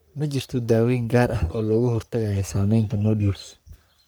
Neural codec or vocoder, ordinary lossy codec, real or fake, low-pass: codec, 44.1 kHz, 3.4 kbps, Pupu-Codec; none; fake; none